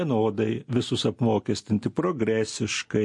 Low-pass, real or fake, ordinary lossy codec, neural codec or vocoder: 10.8 kHz; real; MP3, 48 kbps; none